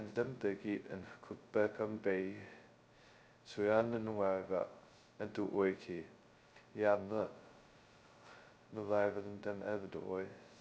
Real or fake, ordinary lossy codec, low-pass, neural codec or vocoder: fake; none; none; codec, 16 kHz, 0.2 kbps, FocalCodec